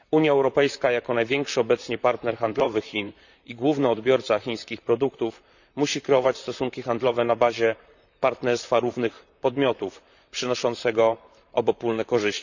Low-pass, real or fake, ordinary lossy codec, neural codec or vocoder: 7.2 kHz; fake; none; vocoder, 44.1 kHz, 128 mel bands, Pupu-Vocoder